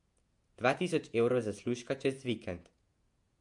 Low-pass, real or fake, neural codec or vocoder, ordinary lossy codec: 10.8 kHz; fake; vocoder, 48 kHz, 128 mel bands, Vocos; MP3, 64 kbps